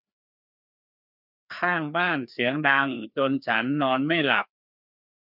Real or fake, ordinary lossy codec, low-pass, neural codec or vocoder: fake; none; 5.4 kHz; codec, 16 kHz, 2 kbps, FreqCodec, larger model